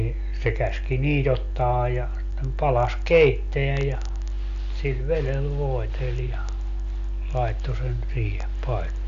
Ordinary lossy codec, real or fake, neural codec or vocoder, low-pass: none; real; none; 7.2 kHz